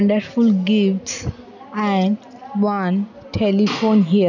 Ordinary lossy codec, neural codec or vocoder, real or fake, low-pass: none; none; real; 7.2 kHz